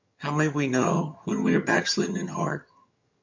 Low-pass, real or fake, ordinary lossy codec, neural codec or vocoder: 7.2 kHz; fake; MP3, 64 kbps; vocoder, 22.05 kHz, 80 mel bands, HiFi-GAN